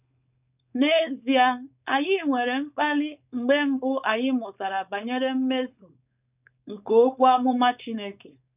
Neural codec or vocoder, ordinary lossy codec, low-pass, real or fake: codec, 16 kHz, 4.8 kbps, FACodec; none; 3.6 kHz; fake